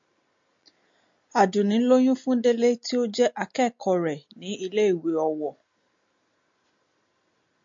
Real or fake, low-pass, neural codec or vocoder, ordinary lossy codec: real; 7.2 kHz; none; MP3, 48 kbps